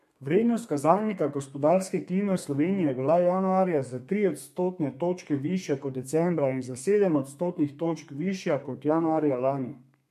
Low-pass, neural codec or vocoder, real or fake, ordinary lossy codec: 14.4 kHz; codec, 32 kHz, 1.9 kbps, SNAC; fake; MP3, 64 kbps